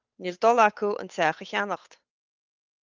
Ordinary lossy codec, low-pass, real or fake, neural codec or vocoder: Opus, 24 kbps; 7.2 kHz; fake; codec, 16 kHz, 8 kbps, FunCodec, trained on Chinese and English, 25 frames a second